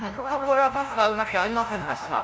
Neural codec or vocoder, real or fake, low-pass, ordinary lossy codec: codec, 16 kHz, 0.5 kbps, FunCodec, trained on LibriTTS, 25 frames a second; fake; none; none